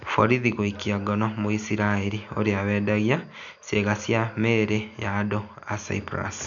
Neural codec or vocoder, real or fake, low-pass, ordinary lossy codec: none; real; 7.2 kHz; none